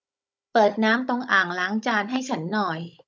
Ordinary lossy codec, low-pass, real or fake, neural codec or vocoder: none; none; fake; codec, 16 kHz, 16 kbps, FunCodec, trained on Chinese and English, 50 frames a second